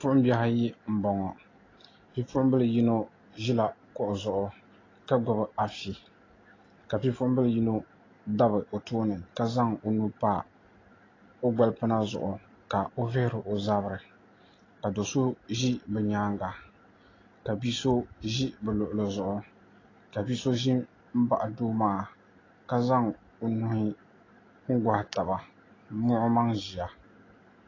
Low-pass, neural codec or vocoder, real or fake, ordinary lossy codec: 7.2 kHz; none; real; AAC, 32 kbps